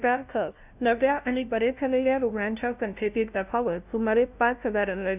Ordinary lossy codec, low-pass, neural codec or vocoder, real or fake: none; 3.6 kHz; codec, 16 kHz, 0.5 kbps, FunCodec, trained on LibriTTS, 25 frames a second; fake